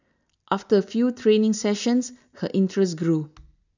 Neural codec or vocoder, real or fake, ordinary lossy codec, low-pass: none; real; none; 7.2 kHz